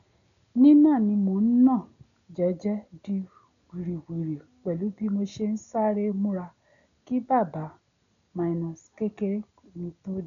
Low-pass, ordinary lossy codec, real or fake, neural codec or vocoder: 7.2 kHz; none; real; none